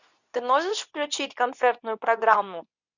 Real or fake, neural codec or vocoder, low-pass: fake; codec, 24 kHz, 0.9 kbps, WavTokenizer, medium speech release version 2; 7.2 kHz